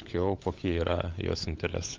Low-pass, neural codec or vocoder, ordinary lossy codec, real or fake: 7.2 kHz; codec, 16 kHz, 16 kbps, FunCodec, trained on LibriTTS, 50 frames a second; Opus, 24 kbps; fake